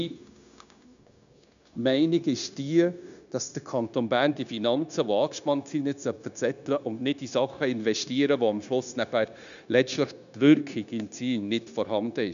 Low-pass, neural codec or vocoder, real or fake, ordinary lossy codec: 7.2 kHz; codec, 16 kHz, 0.9 kbps, LongCat-Audio-Codec; fake; none